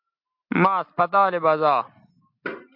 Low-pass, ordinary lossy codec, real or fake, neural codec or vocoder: 5.4 kHz; MP3, 48 kbps; real; none